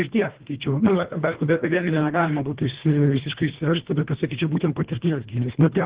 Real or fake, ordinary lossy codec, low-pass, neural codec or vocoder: fake; Opus, 16 kbps; 3.6 kHz; codec, 24 kHz, 1.5 kbps, HILCodec